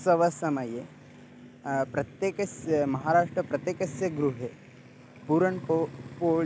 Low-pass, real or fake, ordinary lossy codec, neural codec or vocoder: none; real; none; none